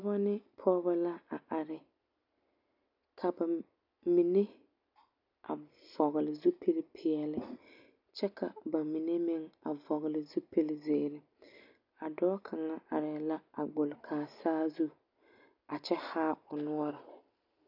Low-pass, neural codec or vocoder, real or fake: 5.4 kHz; none; real